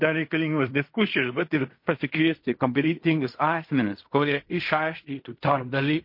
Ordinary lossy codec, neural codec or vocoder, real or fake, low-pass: MP3, 32 kbps; codec, 16 kHz in and 24 kHz out, 0.4 kbps, LongCat-Audio-Codec, fine tuned four codebook decoder; fake; 5.4 kHz